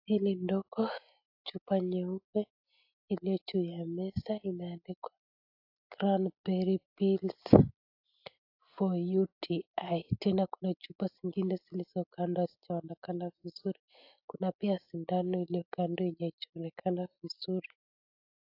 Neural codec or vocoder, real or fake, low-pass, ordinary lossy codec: none; real; 5.4 kHz; AAC, 48 kbps